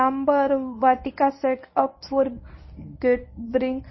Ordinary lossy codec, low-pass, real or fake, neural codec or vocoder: MP3, 24 kbps; 7.2 kHz; fake; codec, 24 kHz, 0.9 kbps, WavTokenizer, medium speech release version 1